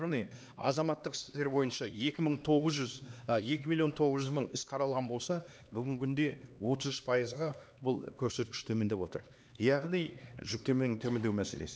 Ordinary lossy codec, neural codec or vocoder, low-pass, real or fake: none; codec, 16 kHz, 2 kbps, X-Codec, HuBERT features, trained on LibriSpeech; none; fake